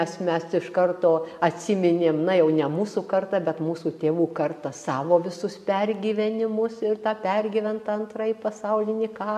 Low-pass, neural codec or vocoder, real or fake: 14.4 kHz; none; real